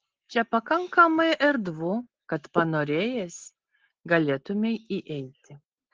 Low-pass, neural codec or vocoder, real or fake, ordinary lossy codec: 7.2 kHz; none; real; Opus, 16 kbps